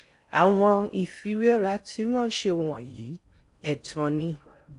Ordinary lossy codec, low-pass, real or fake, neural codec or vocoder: AAC, 64 kbps; 10.8 kHz; fake; codec, 16 kHz in and 24 kHz out, 0.6 kbps, FocalCodec, streaming, 4096 codes